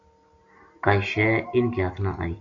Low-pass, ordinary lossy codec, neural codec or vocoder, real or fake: 7.2 kHz; MP3, 48 kbps; none; real